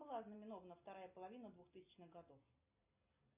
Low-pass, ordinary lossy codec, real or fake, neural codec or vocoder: 3.6 kHz; MP3, 24 kbps; real; none